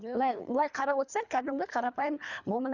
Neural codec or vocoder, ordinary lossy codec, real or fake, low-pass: codec, 24 kHz, 3 kbps, HILCodec; none; fake; 7.2 kHz